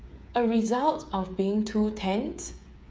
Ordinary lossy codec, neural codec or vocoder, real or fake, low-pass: none; codec, 16 kHz, 8 kbps, FreqCodec, smaller model; fake; none